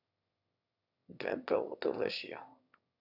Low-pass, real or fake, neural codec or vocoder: 5.4 kHz; fake; autoencoder, 22.05 kHz, a latent of 192 numbers a frame, VITS, trained on one speaker